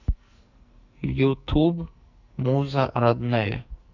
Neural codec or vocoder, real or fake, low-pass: codec, 44.1 kHz, 2.6 kbps, SNAC; fake; 7.2 kHz